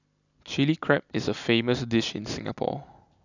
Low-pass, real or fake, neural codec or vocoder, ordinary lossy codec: 7.2 kHz; real; none; none